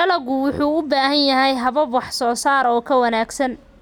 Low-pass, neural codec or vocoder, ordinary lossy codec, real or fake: 19.8 kHz; none; none; real